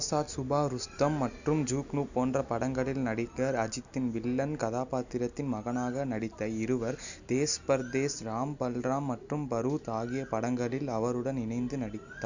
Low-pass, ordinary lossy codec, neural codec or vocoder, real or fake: 7.2 kHz; none; none; real